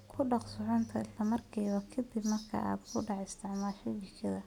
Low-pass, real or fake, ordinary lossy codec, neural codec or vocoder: 19.8 kHz; real; none; none